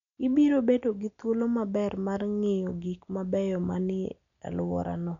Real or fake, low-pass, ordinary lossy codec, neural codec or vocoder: real; 7.2 kHz; none; none